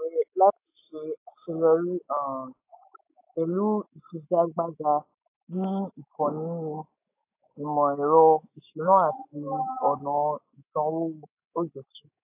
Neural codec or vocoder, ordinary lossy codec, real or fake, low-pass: autoencoder, 48 kHz, 128 numbers a frame, DAC-VAE, trained on Japanese speech; AAC, 24 kbps; fake; 3.6 kHz